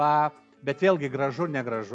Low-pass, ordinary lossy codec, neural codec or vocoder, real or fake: 9.9 kHz; MP3, 64 kbps; none; real